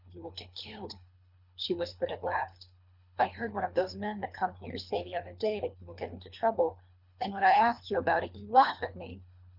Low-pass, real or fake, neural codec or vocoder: 5.4 kHz; fake; codec, 24 kHz, 3 kbps, HILCodec